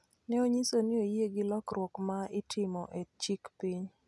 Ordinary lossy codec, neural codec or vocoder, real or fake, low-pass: none; none; real; none